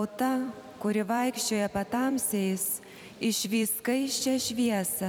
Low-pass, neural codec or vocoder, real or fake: 19.8 kHz; vocoder, 44.1 kHz, 128 mel bands every 256 samples, BigVGAN v2; fake